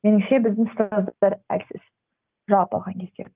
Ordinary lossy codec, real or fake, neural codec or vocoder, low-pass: Opus, 32 kbps; real; none; 3.6 kHz